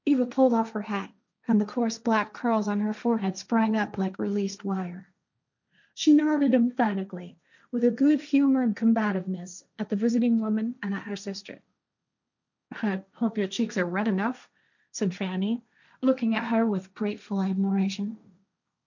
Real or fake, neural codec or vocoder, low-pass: fake; codec, 16 kHz, 1.1 kbps, Voila-Tokenizer; 7.2 kHz